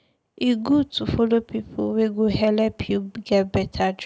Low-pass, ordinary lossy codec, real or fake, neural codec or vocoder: none; none; real; none